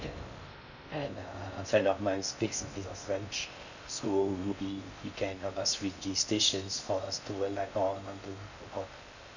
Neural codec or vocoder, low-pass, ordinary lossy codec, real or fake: codec, 16 kHz in and 24 kHz out, 0.6 kbps, FocalCodec, streaming, 4096 codes; 7.2 kHz; none; fake